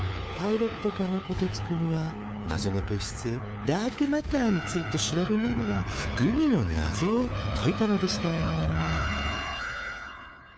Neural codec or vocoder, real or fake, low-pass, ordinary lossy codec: codec, 16 kHz, 4 kbps, FunCodec, trained on LibriTTS, 50 frames a second; fake; none; none